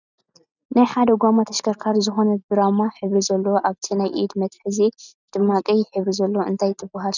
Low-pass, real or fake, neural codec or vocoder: 7.2 kHz; real; none